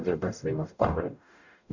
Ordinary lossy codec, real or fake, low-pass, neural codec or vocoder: none; fake; 7.2 kHz; codec, 44.1 kHz, 0.9 kbps, DAC